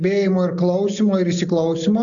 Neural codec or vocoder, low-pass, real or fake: none; 7.2 kHz; real